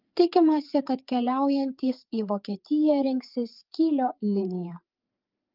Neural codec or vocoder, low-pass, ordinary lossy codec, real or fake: codec, 16 kHz, 4 kbps, FreqCodec, larger model; 5.4 kHz; Opus, 32 kbps; fake